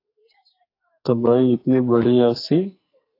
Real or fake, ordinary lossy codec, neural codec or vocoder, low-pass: fake; AAC, 32 kbps; codec, 44.1 kHz, 2.6 kbps, SNAC; 5.4 kHz